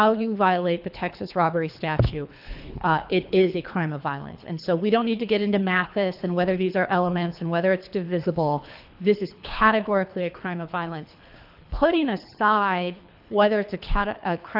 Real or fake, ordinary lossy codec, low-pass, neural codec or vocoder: fake; AAC, 48 kbps; 5.4 kHz; codec, 24 kHz, 3 kbps, HILCodec